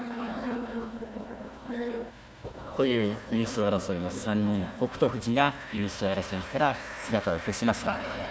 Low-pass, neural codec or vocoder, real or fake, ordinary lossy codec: none; codec, 16 kHz, 1 kbps, FunCodec, trained on Chinese and English, 50 frames a second; fake; none